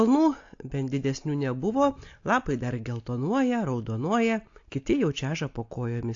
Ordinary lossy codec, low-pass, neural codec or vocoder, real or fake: AAC, 48 kbps; 7.2 kHz; none; real